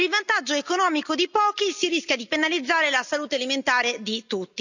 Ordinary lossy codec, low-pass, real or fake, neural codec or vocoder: none; 7.2 kHz; real; none